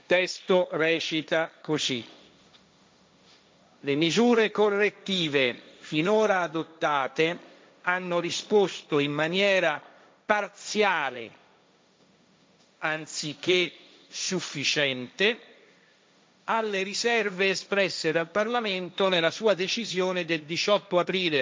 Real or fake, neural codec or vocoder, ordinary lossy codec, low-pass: fake; codec, 16 kHz, 1.1 kbps, Voila-Tokenizer; none; none